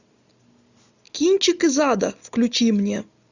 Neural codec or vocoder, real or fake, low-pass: none; real; 7.2 kHz